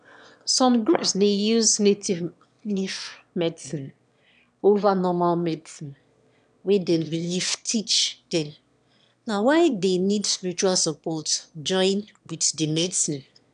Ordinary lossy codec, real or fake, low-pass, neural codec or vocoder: none; fake; 9.9 kHz; autoencoder, 22.05 kHz, a latent of 192 numbers a frame, VITS, trained on one speaker